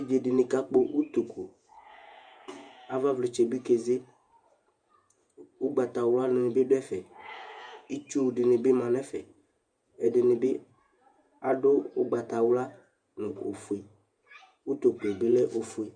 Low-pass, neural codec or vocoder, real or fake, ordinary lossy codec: 9.9 kHz; vocoder, 48 kHz, 128 mel bands, Vocos; fake; Opus, 64 kbps